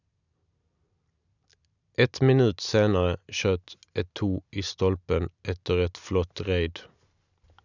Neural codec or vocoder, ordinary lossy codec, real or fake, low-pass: none; none; real; 7.2 kHz